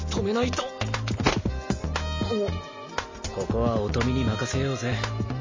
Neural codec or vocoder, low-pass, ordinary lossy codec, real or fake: none; 7.2 kHz; MP3, 32 kbps; real